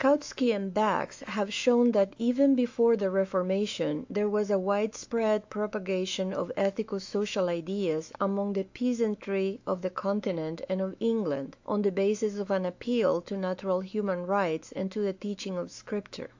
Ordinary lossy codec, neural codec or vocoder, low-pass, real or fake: AAC, 48 kbps; autoencoder, 48 kHz, 128 numbers a frame, DAC-VAE, trained on Japanese speech; 7.2 kHz; fake